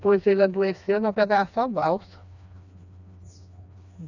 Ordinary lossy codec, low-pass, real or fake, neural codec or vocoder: none; 7.2 kHz; fake; codec, 16 kHz, 2 kbps, FreqCodec, smaller model